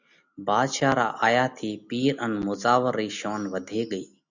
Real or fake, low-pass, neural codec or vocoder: real; 7.2 kHz; none